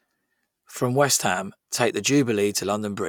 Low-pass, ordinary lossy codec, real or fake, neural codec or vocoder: 19.8 kHz; none; real; none